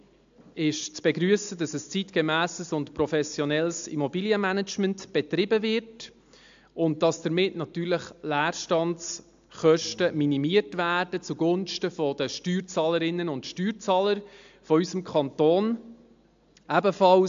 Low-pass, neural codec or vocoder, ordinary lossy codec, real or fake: 7.2 kHz; none; AAC, 64 kbps; real